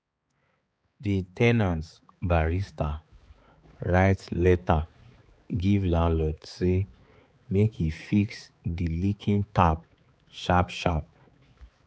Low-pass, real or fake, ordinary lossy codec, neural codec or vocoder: none; fake; none; codec, 16 kHz, 4 kbps, X-Codec, HuBERT features, trained on balanced general audio